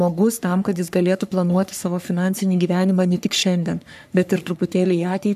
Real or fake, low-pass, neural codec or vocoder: fake; 14.4 kHz; codec, 44.1 kHz, 3.4 kbps, Pupu-Codec